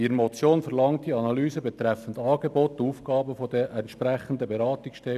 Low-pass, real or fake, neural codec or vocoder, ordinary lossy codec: 14.4 kHz; real; none; none